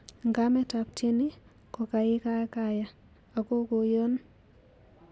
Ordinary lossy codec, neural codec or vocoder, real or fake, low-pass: none; none; real; none